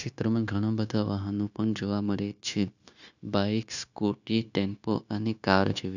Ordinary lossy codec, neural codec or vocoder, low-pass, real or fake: none; codec, 16 kHz, 0.9 kbps, LongCat-Audio-Codec; 7.2 kHz; fake